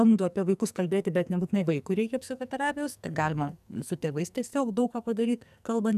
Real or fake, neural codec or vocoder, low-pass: fake; codec, 44.1 kHz, 2.6 kbps, SNAC; 14.4 kHz